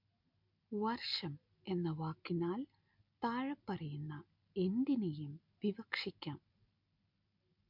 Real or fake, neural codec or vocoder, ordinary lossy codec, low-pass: real; none; MP3, 48 kbps; 5.4 kHz